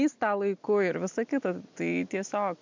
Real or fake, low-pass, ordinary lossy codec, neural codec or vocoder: real; 7.2 kHz; MP3, 64 kbps; none